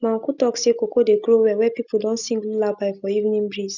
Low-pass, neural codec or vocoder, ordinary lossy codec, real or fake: 7.2 kHz; none; none; real